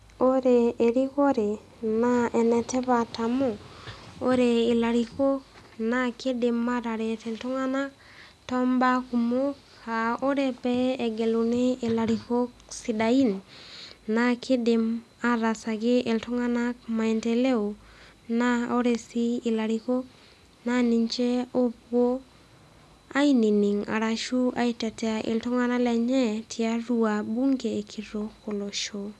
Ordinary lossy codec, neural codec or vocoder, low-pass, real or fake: none; none; none; real